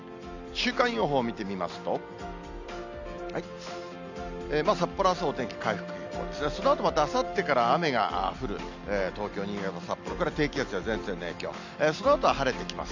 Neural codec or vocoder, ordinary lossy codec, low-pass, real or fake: none; none; 7.2 kHz; real